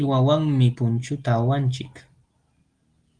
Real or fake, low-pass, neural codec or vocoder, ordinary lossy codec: real; 9.9 kHz; none; Opus, 24 kbps